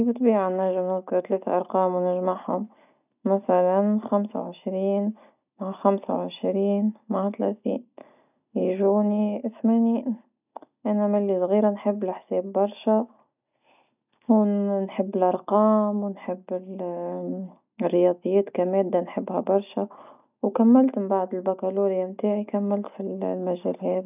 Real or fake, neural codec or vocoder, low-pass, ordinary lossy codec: real; none; 3.6 kHz; none